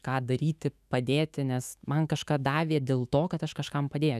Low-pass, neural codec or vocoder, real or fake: 14.4 kHz; autoencoder, 48 kHz, 128 numbers a frame, DAC-VAE, trained on Japanese speech; fake